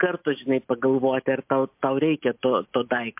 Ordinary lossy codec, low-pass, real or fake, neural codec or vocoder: MP3, 32 kbps; 3.6 kHz; real; none